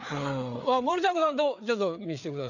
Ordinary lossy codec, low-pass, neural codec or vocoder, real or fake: none; 7.2 kHz; codec, 16 kHz, 4 kbps, FreqCodec, larger model; fake